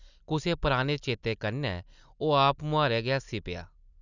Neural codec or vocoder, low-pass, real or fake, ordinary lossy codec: none; 7.2 kHz; real; none